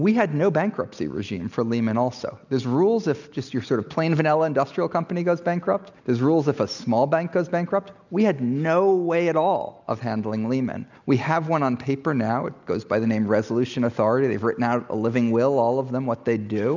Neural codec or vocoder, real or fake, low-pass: none; real; 7.2 kHz